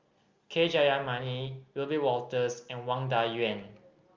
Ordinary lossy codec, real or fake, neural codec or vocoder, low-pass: Opus, 32 kbps; real; none; 7.2 kHz